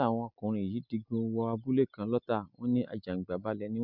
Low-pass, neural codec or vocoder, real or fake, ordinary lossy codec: 5.4 kHz; none; real; none